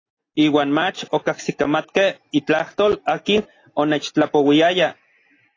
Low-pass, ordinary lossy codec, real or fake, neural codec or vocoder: 7.2 kHz; AAC, 32 kbps; real; none